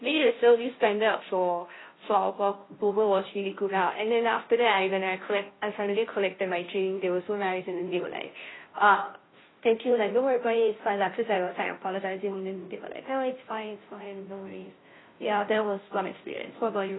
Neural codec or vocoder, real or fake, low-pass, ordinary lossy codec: codec, 16 kHz, 0.5 kbps, FunCodec, trained on Chinese and English, 25 frames a second; fake; 7.2 kHz; AAC, 16 kbps